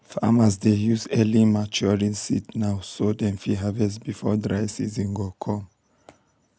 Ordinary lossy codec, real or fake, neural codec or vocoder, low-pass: none; real; none; none